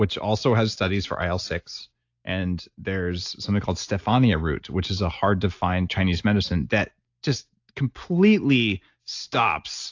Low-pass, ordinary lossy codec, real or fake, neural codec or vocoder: 7.2 kHz; AAC, 48 kbps; real; none